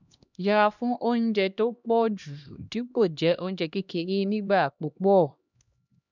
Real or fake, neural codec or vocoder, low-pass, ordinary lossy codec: fake; codec, 16 kHz, 1 kbps, X-Codec, HuBERT features, trained on LibriSpeech; 7.2 kHz; none